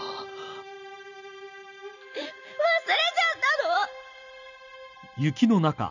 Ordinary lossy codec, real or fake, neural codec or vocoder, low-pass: none; real; none; 7.2 kHz